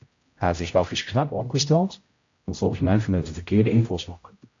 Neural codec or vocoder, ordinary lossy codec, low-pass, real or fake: codec, 16 kHz, 0.5 kbps, X-Codec, HuBERT features, trained on general audio; AAC, 48 kbps; 7.2 kHz; fake